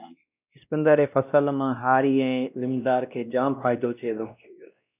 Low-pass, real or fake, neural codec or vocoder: 3.6 kHz; fake; codec, 16 kHz, 1 kbps, X-Codec, WavLM features, trained on Multilingual LibriSpeech